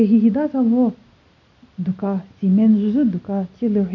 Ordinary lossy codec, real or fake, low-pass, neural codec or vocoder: none; real; 7.2 kHz; none